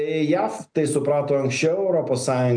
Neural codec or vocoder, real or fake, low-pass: none; real; 9.9 kHz